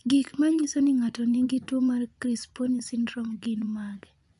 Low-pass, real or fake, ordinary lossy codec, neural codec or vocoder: 10.8 kHz; fake; none; vocoder, 24 kHz, 100 mel bands, Vocos